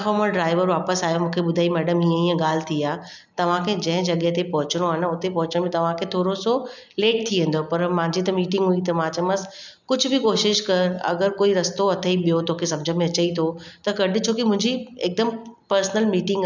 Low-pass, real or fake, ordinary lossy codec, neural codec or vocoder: 7.2 kHz; real; none; none